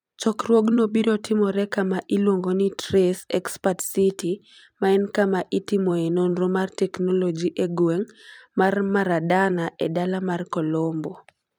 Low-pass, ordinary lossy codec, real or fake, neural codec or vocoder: 19.8 kHz; none; fake; vocoder, 44.1 kHz, 128 mel bands every 256 samples, BigVGAN v2